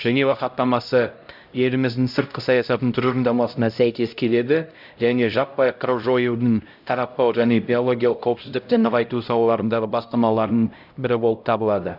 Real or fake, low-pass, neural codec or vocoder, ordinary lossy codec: fake; 5.4 kHz; codec, 16 kHz, 0.5 kbps, X-Codec, HuBERT features, trained on LibriSpeech; none